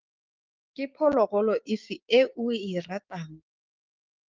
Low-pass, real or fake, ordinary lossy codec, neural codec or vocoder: 7.2 kHz; fake; Opus, 32 kbps; codec, 16 kHz, 6 kbps, DAC